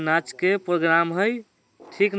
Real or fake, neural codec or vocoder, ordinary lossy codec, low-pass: real; none; none; none